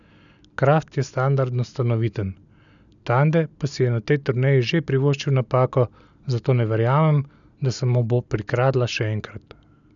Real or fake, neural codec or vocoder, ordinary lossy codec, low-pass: real; none; none; 7.2 kHz